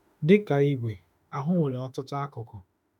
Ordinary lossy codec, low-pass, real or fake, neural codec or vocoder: none; 19.8 kHz; fake; autoencoder, 48 kHz, 32 numbers a frame, DAC-VAE, trained on Japanese speech